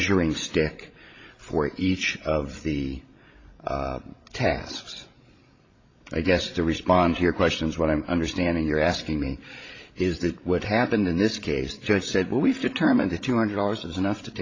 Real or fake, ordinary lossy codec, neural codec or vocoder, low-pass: fake; AAC, 32 kbps; codec, 16 kHz, 16 kbps, FreqCodec, larger model; 7.2 kHz